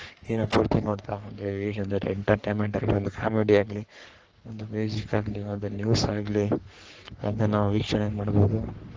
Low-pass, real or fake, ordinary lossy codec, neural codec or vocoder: 7.2 kHz; fake; Opus, 16 kbps; codec, 44.1 kHz, 3.4 kbps, Pupu-Codec